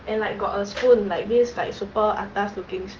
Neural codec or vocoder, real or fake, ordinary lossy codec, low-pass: none; real; Opus, 16 kbps; 7.2 kHz